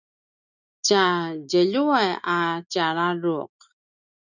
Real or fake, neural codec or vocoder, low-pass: real; none; 7.2 kHz